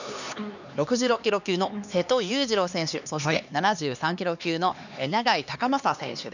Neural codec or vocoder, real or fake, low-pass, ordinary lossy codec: codec, 16 kHz, 2 kbps, X-Codec, HuBERT features, trained on LibriSpeech; fake; 7.2 kHz; none